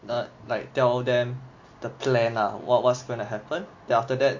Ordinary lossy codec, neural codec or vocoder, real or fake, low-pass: MP3, 48 kbps; none; real; 7.2 kHz